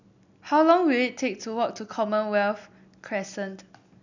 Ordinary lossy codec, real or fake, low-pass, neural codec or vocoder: none; real; 7.2 kHz; none